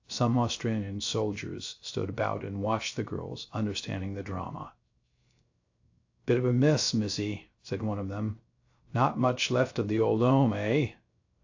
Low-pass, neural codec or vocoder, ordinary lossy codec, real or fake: 7.2 kHz; codec, 16 kHz, 0.3 kbps, FocalCodec; AAC, 48 kbps; fake